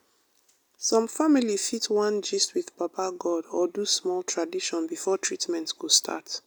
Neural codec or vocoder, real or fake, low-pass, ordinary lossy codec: none; real; none; none